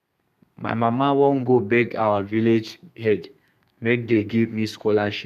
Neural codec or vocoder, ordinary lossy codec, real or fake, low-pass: codec, 32 kHz, 1.9 kbps, SNAC; none; fake; 14.4 kHz